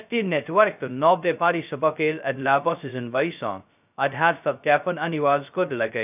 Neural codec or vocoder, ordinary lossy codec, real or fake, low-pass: codec, 16 kHz, 0.2 kbps, FocalCodec; none; fake; 3.6 kHz